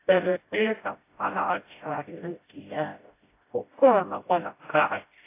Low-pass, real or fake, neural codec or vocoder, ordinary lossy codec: 3.6 kHz; fake; codec, 16 kHz, 0.5 kbps, FreqCodec, smaller model; AAC, 24 kbps